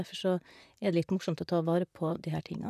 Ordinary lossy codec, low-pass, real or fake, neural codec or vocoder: none; 14.4 kHz; fake; vocoder, 44.1 kHz, 128 mel bands, Pupu-Vocoder